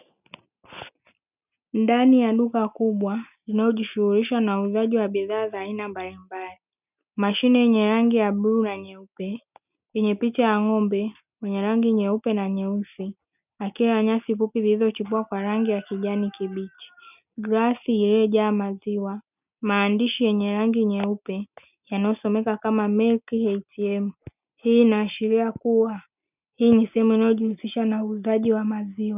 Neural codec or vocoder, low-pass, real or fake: none; 3.6 kHz; real